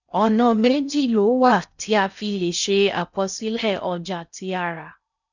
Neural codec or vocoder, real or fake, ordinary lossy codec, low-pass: codec, 16 kHz in and 24 kHz out, 0.6 kbps, FocalCodec, streaming, 4096 codes; fake; none; 7.2 kHz